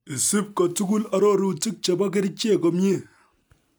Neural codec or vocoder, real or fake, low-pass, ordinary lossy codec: none; real; none; none